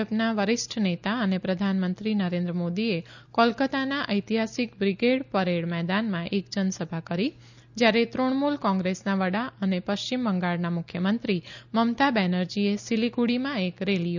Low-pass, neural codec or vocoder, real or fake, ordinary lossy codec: 7.2 kHz; none; real; none